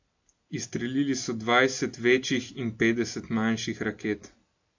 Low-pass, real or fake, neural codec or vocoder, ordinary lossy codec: 7.2 kHz; real; none; AAC, 48 kbps